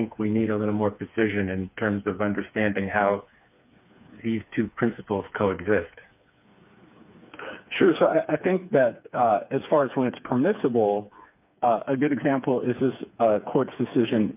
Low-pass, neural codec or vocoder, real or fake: 3.6 kHz; codec, 16 kHz, 4 kbps, FreqCodec, smaller model; fake